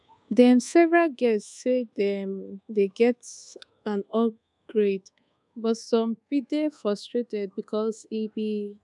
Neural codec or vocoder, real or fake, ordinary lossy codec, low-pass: codec, 24 kHz, 1.2 kbps, DualCodec; fake; none; 10.8 kHz